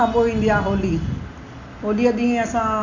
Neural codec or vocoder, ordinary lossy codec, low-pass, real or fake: none; none; 7.2 kHz; real